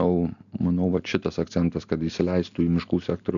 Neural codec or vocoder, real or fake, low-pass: none; real; 7.2 kHz